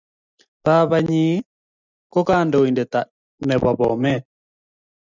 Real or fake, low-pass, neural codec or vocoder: real; 7.2 kHz; none